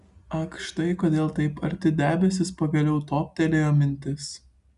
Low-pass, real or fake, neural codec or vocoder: 10.8 kHz; real; none